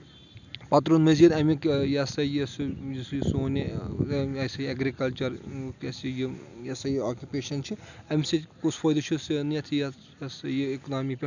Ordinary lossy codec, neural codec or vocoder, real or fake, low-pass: none; none; real; 7.2 kHz